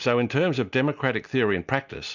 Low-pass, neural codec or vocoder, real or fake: 7.2 kHz; none; real